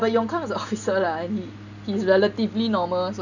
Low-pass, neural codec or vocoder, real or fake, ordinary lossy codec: 7.2 kHz; none; real; none